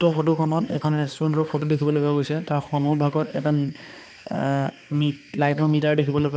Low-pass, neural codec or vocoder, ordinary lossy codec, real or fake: none; codec, 16 kHz, 2 kbps, X-Codec, HuBERT features, trained on balanced general audio; none; fake